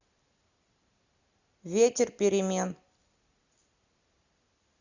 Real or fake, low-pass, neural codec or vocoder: real; 7.2 kHz; none